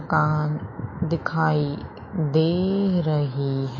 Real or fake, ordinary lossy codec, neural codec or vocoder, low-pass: fake; MP3, 32 kbps; autoencoder, 48 kHz, 128 numbers a frame, DAC-VAE, trained on Japanese speech; 7.2 kHz